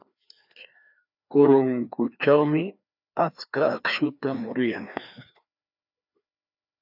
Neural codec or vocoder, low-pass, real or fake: codec, 16 kHz, 2 kbps, FreqCodec, larger model; 5.4 kHz; fake